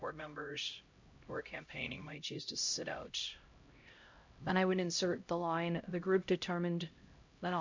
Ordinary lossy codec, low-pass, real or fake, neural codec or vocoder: MP3, 48 kbps; 7.2 kHz; fake; codec, 16 kHz, 0.5 kbps, X-Codec, HuBERT features, trained on LibriSpeech